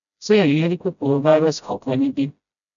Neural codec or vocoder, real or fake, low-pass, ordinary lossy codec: codec, 16 kHz, 0.5 kbps, FreqCodec, smaller model; fake; 7.2 kHz; none